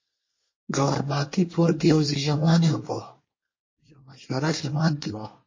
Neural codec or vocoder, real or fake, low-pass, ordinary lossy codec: codec, 24 kHz, 1 kbps, SNAC; fake; 7.2 kHz; MP3, 32 kbps